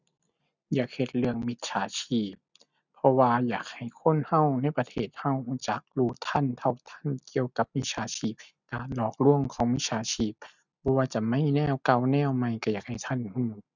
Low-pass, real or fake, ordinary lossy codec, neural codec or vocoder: 7.2 kHz; real; none; none